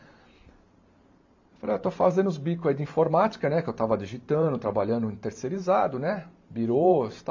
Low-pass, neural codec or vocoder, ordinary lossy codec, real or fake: 7.2 kHz; vocoder, 44.1 kHz, 128 mel bands every 512 samples, BigVGAN v2; AAC, 48 kbps; fake